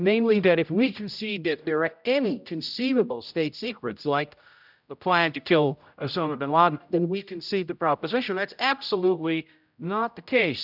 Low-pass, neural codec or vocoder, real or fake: 5.4 kHz; codec, 16 kHz, 0.5 kbps, X-Codec, HuBERT features, trained on general audio; fake